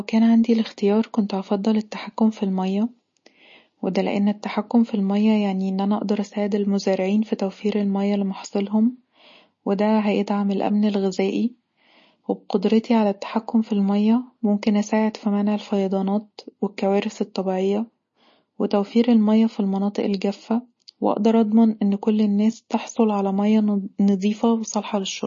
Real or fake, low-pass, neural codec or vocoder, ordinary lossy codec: real; 7.2 kHz; none; MP3, 32 kbps